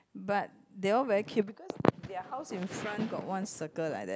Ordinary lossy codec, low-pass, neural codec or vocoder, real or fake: none; none; none; real